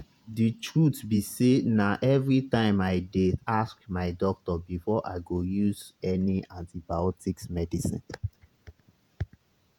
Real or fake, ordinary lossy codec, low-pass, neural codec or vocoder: fake; none; 19.8 kHz; vocoder, 48 kHz, 128 mel bands, Vocos